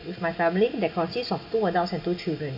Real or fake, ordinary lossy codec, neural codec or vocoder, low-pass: real; none; none; 5.4 kHz